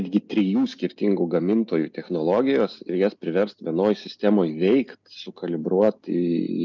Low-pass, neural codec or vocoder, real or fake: 7.2 kHz; none; real